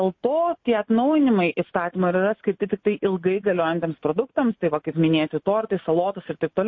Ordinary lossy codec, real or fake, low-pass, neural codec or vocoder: MP3, 32 kbps; real; 7.2 kHz; none